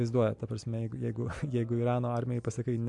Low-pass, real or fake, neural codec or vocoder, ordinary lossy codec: 10.8 kHz; real; none; MP3, 64 kbps